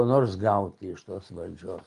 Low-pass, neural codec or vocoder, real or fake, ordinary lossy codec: 10.8 kHz; none; real; Opus, 32 kbps